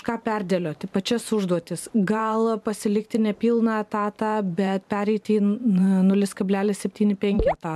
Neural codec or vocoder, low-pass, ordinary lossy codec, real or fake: vocoder, 44.1 kHz, 128 mel bands every 512 samples, BigVGAN v2; 14.4 kHz; MP3, 96 kbps; fake